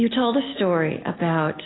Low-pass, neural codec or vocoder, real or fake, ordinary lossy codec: 7.2 kHz; none; real; AAC, 16 kbps